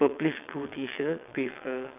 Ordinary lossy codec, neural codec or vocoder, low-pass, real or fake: none; vocoder, 22.05 kHz, 80 mel bands, WaveNeXt; 3.6 kHz; fake